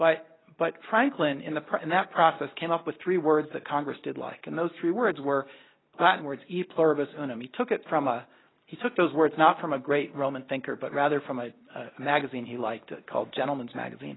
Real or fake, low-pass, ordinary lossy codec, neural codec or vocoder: real; 7.2 kHz; AAC, 16 kbps; none